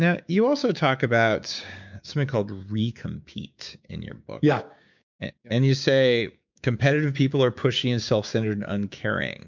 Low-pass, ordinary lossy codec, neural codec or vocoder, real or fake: 7.2 kHz; MP3, 64 kbps; codec, 16 kHz, 6 kbps, DAC; fake